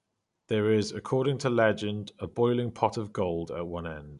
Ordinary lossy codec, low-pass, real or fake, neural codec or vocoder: none; 10.8 kHz; real; none